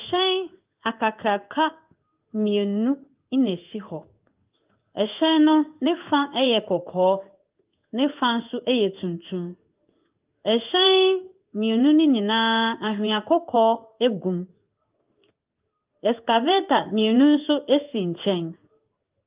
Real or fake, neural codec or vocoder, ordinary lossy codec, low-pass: fake; codec, 16 kHz in and 24 kHz out, 1 kbps, XY-Tokenizer; Opus, 32 kbps; 3.6 kHz